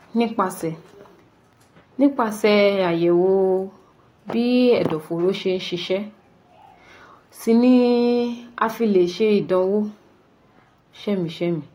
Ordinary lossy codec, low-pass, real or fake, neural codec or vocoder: AAC, 48 kbps; 19.8 kHz; real; none